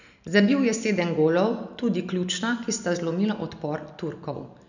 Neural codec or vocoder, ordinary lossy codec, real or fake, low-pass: none; none; real; 7.2 kHz